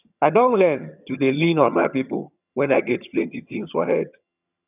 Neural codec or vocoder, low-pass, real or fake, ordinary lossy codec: vocoder, 22.05 kHz, 80 mel bands, HiFi-GAN; 3.6 kHz; fake; none